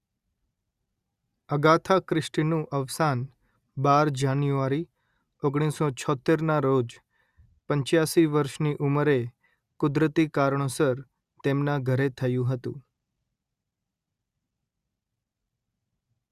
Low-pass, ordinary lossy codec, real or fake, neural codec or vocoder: 14.4 kHz; Opus, 64 kbps; real; none